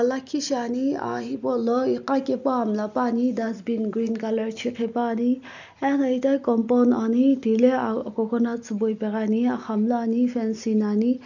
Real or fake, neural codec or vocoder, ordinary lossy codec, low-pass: real; none; none; 7.2 kHz